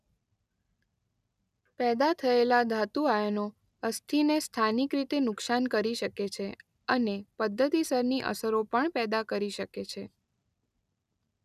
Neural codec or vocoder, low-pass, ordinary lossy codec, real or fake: none; 14.4 kHz; none; real